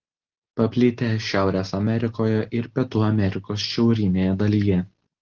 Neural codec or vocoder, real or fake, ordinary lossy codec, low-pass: none; real; Opus, 16 kbps; 7.2 kHz